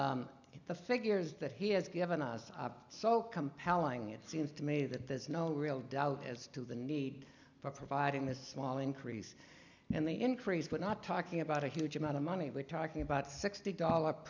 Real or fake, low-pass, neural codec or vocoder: real; 7.2 kHz; none